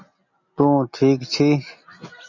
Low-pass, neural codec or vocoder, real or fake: 7.2 kHz; none; real